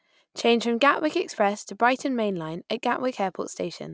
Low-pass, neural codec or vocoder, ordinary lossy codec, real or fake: none; none; none; real